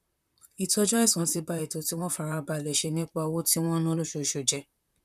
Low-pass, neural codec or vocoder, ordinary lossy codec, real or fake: 14.4 kHz; vocoder, 44.1 kHz, 128 mel bands, Pupu-Vocoder; none; fake